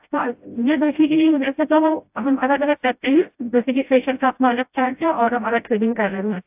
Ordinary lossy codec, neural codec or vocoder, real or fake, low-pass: AAC, 32 kbps; codec, 16 kHz, 0.5 kbps, FreqCodec, smaller model; fake; 3.6 kHz